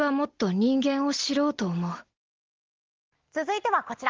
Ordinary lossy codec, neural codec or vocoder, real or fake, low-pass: Opus, 16 kbps; none; real; 7.2 kHz